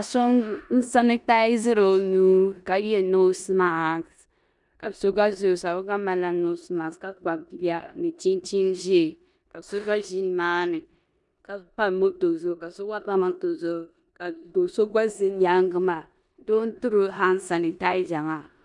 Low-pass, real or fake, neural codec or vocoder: 10.8 kHz; fake; codec, 16 kHz in and 24 kHz out, 0.9 kbps, LongCat-Audio-Codec, four codebook decoder